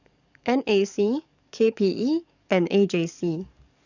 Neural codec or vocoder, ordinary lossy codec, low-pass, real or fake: codec, 44.1 kHz, 7.8 kbps, DAC; none; 7.2 kHz; fake